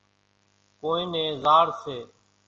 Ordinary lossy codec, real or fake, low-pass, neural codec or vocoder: Opus, 24 kbps; real; 7.2 kHz; none